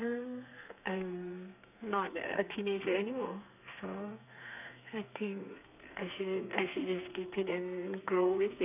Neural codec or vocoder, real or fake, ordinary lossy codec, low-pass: codec, 32 kHz, 1.9 kbps, SNAC; fake; none; 3.6 kHz